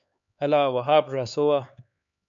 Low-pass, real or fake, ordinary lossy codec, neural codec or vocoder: 7.2 kHz; fake; MP3, 64 kbps; codec, 16 kHz, 4 kbps, X-Codec, HuBERT features, trained on LibriSpeech